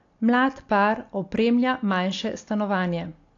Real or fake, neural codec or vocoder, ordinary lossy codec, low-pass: real; none; AAC, 48 kbps; 7.2 kHz